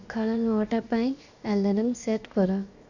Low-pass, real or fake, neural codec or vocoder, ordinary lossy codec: 7.2 kHz; fake; codec, 16 kHz, 0.7 kbps, FocalCodec; none